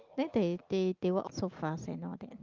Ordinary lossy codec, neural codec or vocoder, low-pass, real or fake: Opus, 32 kbps; none; 7.2 kHz; real